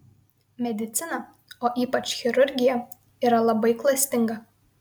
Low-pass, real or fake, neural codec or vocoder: 19.8 kHz; real; none